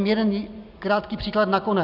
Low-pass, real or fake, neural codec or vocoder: 5.4 kHz; real; none